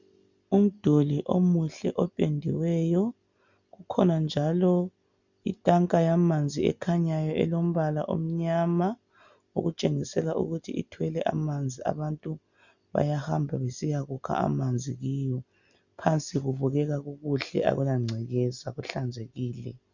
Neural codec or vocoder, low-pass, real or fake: none; 7.2 kHz; real